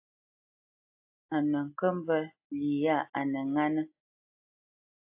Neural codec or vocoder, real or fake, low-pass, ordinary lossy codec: none; real; 3.6 kHz; AAC, 32 kbps